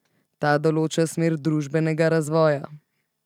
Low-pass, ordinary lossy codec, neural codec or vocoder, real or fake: 19.8 kHz; none; none; real